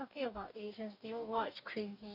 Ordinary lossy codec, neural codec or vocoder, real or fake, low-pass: none; codec, 44.1 kHz, 2.6 kbps, DAC; fake; 5.4 kHz